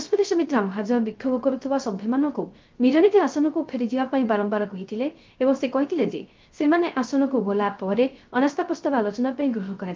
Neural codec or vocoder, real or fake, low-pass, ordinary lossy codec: codec, 16 kHz, 0.3 kbps, FocalCodec; fake; 7.2 kHz; Opus, 32 kbps